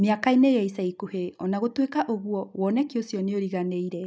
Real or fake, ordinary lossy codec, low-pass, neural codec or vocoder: real; none; none; none